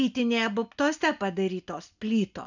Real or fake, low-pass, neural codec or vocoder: real; 7.2 kHz; none